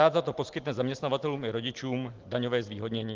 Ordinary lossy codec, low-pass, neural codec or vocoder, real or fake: Opus, 16 kbps; 7.2 kHz; none; real